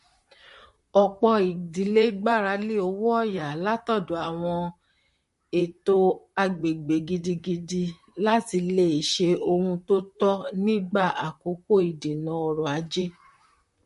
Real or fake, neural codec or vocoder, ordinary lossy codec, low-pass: fake; vocoder, 44.1 kHz, 128 mel bands, Pupu-Vocoder; MP3, 48 kbps; 14.4 kHz